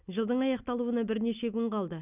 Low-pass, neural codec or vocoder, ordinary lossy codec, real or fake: 3.6 kHz; none; none; real